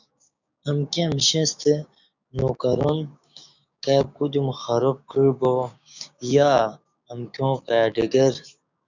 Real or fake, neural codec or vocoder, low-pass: fake; codec, 16 kHz, 6 kbps, DAC; 7.2 kHz